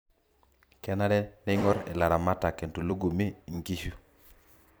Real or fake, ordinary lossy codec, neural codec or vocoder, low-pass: fake; none; vocoder, 44.1 kHz, 128 mel bands every 256 samples, BigVGAN v2; none